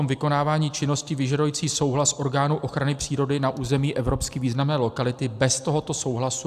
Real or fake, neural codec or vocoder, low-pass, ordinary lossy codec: real; none; 14.4 kHz; AAC, 96 kbps